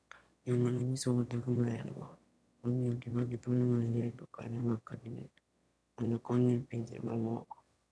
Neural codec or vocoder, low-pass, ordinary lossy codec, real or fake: autoencoder, 22.05 kHz, a latent of 192 numbers a frame, VITS, trained on one speaker; none; none; fake